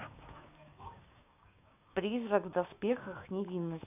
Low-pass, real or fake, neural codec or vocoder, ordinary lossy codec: 3.6 kHz; fake; codec, 16 kHz, 6 kbps, DAC; none